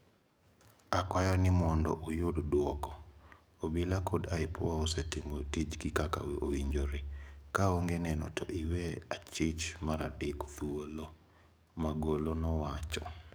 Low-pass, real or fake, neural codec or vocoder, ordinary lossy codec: none; fake; codec, 44.1 kHz, 7.8 kbps, DAC; none